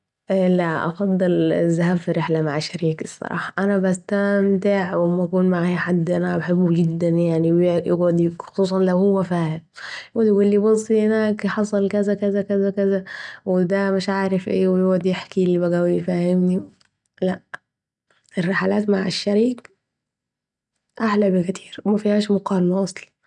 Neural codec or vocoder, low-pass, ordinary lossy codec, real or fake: none; 10.8 kHz; none; real